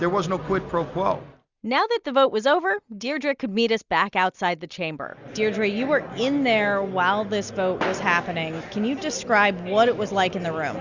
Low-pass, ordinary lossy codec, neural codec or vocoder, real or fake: 7.2 kHz; Opus, 64 kbps; none; real